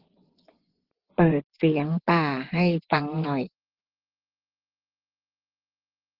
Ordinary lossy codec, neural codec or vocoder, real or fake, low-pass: Opus, 16 kbps; vocoder, 24 kHz, 100 mel bands, Vocos; fake; 5.4 kHz